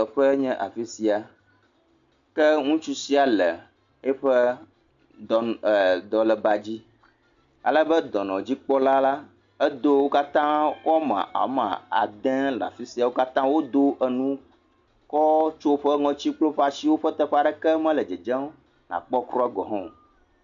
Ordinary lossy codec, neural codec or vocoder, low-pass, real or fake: MP3, 64 kbps; none; 7.2 kHz; real